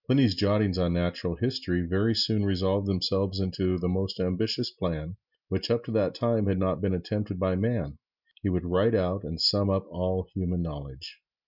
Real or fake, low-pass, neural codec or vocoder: real; 5.4 kHz; none